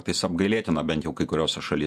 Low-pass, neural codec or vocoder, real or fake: 14.4 kHz; vocoder, 48 kHz, 128 mel bands, Vocos; fake